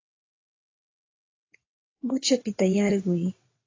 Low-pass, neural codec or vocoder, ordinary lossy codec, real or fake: 7.2 kHz; vocoder, 22.05 kHz, 80 mel bands, WaveNeXt; AAC, 32 kbps; fake